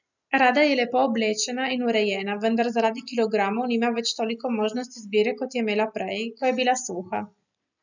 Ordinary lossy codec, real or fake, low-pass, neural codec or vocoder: none; real; 7.2 kHz; none